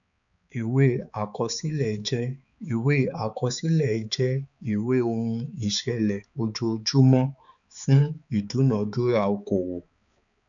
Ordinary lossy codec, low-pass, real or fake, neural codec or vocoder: none; 7.2 kHz; fake; codec, 16 kHz, 4 kbps, X-Codec, HuBERT features, trained on balanced general audio